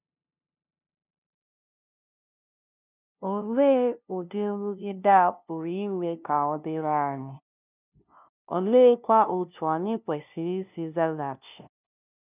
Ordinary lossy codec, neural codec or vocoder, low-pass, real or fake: none; codec, 16 kHz, 0.5 kbps, FunCodec, trained on LibriTTS, 25 frames a second; 3.6 kHz; fake